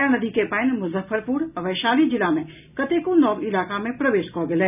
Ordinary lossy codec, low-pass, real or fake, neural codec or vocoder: none; 3.6 kHz; real; none